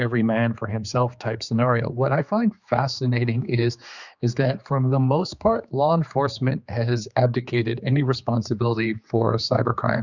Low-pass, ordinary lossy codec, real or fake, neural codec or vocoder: 7.2 kHz; Opus, 64 kbps; fake; codec, 16 kHz, 4 kbps, X-Codec, HuBERT features, trained on general audio